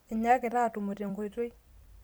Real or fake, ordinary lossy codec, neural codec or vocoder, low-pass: fake; none; vocoder, 44.1 kHz, 128 mel bands every 256 samples, BigVGAN v2; none